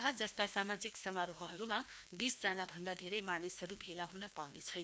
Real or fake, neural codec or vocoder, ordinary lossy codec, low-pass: fake; codec, 16 kHz, 1 kbps, FreqCodec, larger model; none; none